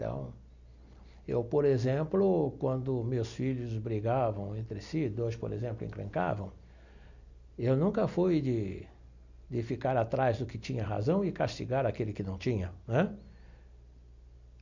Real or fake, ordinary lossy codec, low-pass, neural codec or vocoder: real; none; 7.2 kHz; none